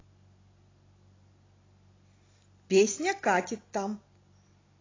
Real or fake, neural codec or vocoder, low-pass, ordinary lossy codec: real; none; 7.2 kHz; AAC, 32 kbps